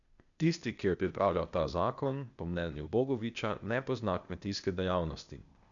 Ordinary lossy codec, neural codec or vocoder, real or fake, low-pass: none; codec, 16 kHz, 0.8 kbps, ZipCodec; fake; 7.2 kHz